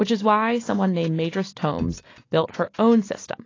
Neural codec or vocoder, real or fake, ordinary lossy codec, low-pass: none; real; AAC, 32 kbps; 7.2 kHz